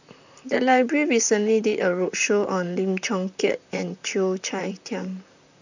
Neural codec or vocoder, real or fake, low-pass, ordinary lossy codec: vocoder, 44.1 kHz, 128 mel bands, Pupu-Vocoder; fake; 7.2 kHz; none